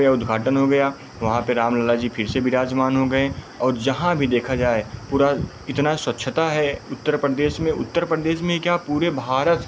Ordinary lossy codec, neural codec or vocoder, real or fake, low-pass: none; none; real; none